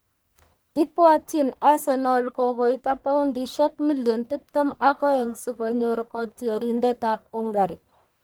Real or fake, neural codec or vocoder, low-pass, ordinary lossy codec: fake; codec, 44.1 kHz, 1.7 kbps, Pupu-Codec; none; none